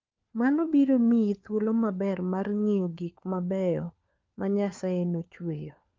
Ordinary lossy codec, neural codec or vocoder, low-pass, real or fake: Opus, 24 kbps; codec, 16 kHz, 4 kbps, FunCodec, trained on LibriTTS, 50 frames a second; 7.2 kHz; fake